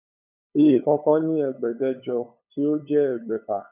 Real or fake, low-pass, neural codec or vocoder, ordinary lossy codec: fake; 3.6 kHz; codec, 16 kHz, 8 kbps, FunCodec, trained on LibriTTS, 25 frames a second; none